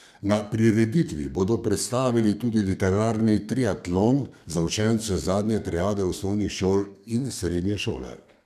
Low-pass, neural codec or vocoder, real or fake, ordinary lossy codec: 14.4 kHz; codec, 32 kHz, 1.9 kbps, SNAC; fake; none